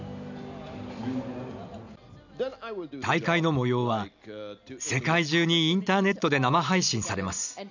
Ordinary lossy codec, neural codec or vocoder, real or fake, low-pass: none; none; real; 7.2 kHz